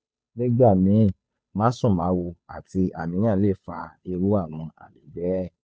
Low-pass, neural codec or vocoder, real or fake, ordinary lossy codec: none; codec, 16 kHz, 2 kbps, FunCodec, trained on Chinese and English, 25 frames a second; fake; none